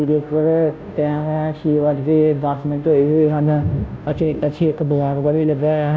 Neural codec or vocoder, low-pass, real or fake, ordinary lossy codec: codec, 16 kHz, 0.5 kbps, FunCodec, trained on Chinese and English, 25 frames a second; none; fake; none